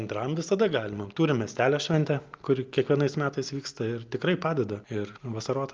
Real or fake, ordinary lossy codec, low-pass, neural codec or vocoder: real; Opus, 32 kbps; 7.2 kHz; none